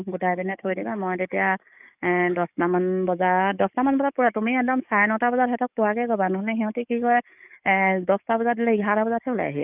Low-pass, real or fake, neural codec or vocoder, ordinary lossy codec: 3.6 kHz; fake; codec, 44.1 kHz, 7.8 kbps, DAC; none